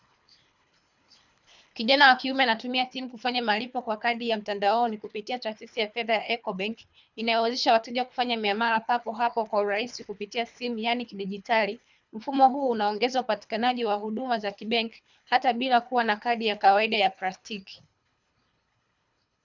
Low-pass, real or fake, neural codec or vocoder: 7.2 kHz; fake; codec, 24 kHz, 3 kbps, HILCodec